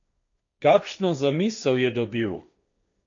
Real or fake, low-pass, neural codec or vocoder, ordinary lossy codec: fake; 7.2 kHz; codec, 16 kHz, 1.1 kbps, Voila-Tokenizer; MP3, 64 kbps